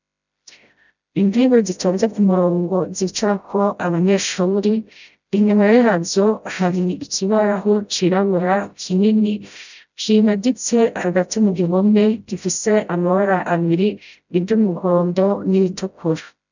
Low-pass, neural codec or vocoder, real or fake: 7.2 kHz; codec, 16 kHz, 0.5 kbps, FreqCodec, smaller model; fake